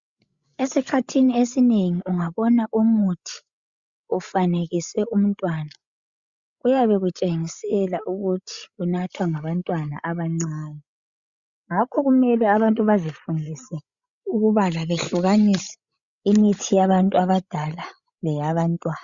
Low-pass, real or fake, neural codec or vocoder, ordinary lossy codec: 7.2 kHz; fake; codec, 16 kHz, 16 kbps, FreqCodec, larger model; Opus, 64 kbps